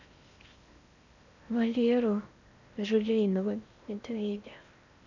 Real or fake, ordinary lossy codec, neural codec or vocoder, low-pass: fake; none; codec, 16 kHz in and 24 kHz out, 0.8 kbps, FocalCodec, streaming, 65536 codes; 7.2 kHz